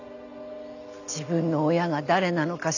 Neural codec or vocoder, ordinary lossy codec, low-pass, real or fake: none; none; 7.2 kHz; real